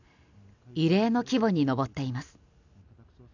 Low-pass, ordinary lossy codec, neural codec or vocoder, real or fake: 7.2 kHz; none; none; real